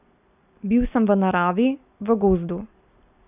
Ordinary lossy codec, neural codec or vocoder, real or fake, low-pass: none; none; real; 3.6 kHz